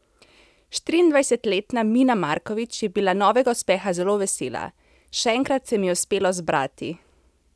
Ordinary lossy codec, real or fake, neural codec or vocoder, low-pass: none; real; none; none